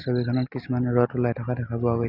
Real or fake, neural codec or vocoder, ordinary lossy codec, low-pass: real; none; none; 5.4 kHz